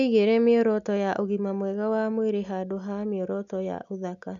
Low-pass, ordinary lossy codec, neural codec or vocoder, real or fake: 7.2 kHz; none; none; real